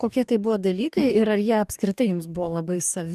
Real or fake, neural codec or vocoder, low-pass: fake; codec, 44.1 kHz, 2.6 kbps, DAC; 14.4 kHz